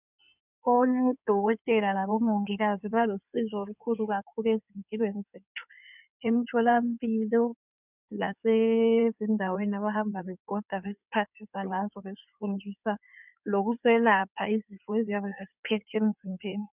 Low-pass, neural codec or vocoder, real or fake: 3.6 kHz; codec, 16 kHz in and 24 kHz out, 2.2 kbps, FireRedTTS-2 codec; fake